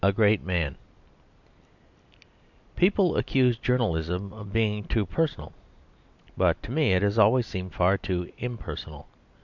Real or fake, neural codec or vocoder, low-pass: real; none; 7.2 kHz